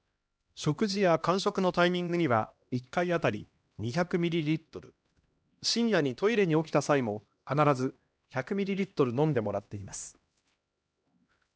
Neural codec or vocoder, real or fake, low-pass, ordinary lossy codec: codec, 16 kHz, 1 kbps, X-Codec, HuBERT features, trained on LibriSpeech; fake; none; none